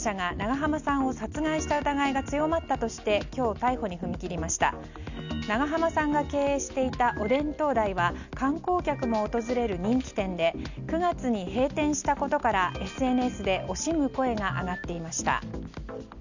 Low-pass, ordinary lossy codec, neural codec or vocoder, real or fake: 7.2 kHz; none; none; real